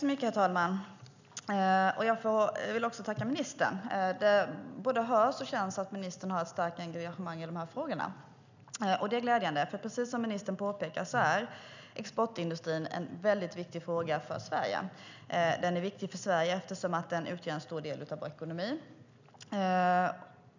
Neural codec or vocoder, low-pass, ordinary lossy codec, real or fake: none; 7.2 kHz; none; real